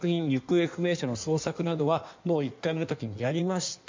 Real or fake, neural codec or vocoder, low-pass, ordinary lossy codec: fake; codec, 16 kHz in and 24 kHz out, 1.1 kbps, FireRedTTS-2 codec; 7.2 kHz; MP3, 64 kbps